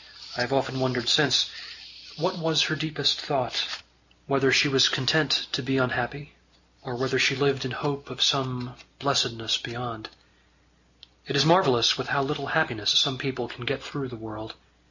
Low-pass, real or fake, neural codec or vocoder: 7.2 kHz; real; none